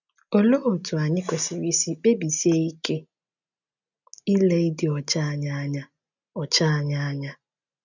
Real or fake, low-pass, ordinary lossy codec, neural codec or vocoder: real; 7.2 kHz; none; none